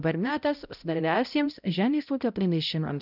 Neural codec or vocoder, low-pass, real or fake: codec, 16 kHz, 0.5 kbps, X-Codec, HuBERT features, trained on balanced general audio; 5.4 kHz; fake